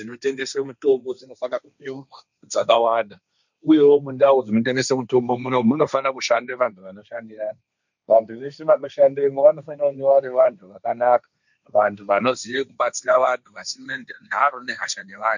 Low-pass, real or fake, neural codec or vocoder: 7.2 kHz; fake; codec, 16 kHz, 1.1 kbps, Voila-Tokenizer